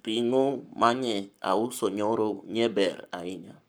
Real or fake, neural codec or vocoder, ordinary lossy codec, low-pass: fake; codec, 44.1 kHz, 7.8 kbps, Pupu-Codec; none; none